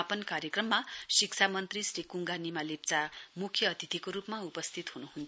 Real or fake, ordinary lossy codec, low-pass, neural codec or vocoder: real; none; none; none